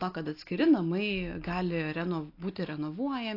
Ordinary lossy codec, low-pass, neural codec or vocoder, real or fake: AAC, 32 kbps; 5.4 kHz; none; real